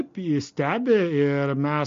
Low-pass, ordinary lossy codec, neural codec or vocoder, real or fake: 7.2 kHz; MP3, 48 kbps; none; real